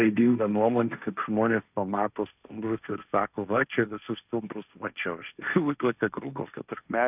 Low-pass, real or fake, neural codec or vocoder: 3.6 kHz; fake; codec, 16 kHz, 1.1 kbps, Voila-Tokenizer